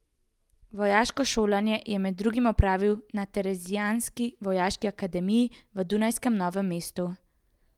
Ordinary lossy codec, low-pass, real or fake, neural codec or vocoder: Opus, 32 kbps; 19.8 kHz; real; none